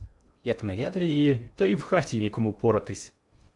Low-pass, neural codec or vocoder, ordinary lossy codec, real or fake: 10.8 kHz; codec, 16 kHz in and 24 kHz out, 0.6 kbps, FocalCodec, streaming, 2048 codes; MP3, 64 kbps; fake